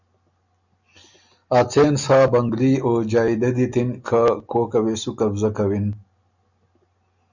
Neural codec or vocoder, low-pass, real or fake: none; 7.2 kHz; real